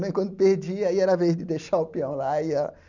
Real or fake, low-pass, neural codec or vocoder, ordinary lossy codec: real; 7.2 kHz; none; none